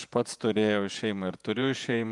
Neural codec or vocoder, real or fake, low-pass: vocoder, 48 kHz, 128 mel bands, Vocos; fake; 10.8 kHz